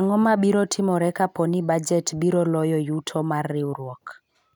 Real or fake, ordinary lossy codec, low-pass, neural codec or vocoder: real; none; 19.8 kHz; none